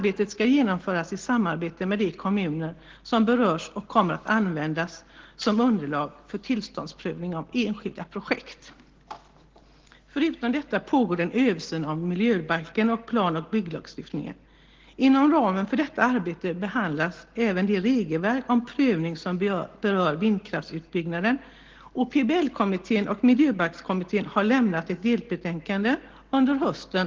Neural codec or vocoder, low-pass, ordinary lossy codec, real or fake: none; 7.2 kHz; Opus, 16 kbps; real